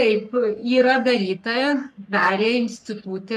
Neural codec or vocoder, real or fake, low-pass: codec, 44.1 kHz, 3.4 kbps, Pupu-Codec; fake; 14.4 kHz